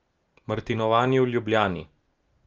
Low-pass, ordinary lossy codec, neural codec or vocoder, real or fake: 7.2 kHz; Opus, 24 kbps; none; real